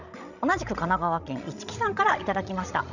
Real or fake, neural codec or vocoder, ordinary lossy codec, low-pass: fake; codec, 16 kHz, 16 kbps, FreqCodec, larger model; none; 7.2 kHz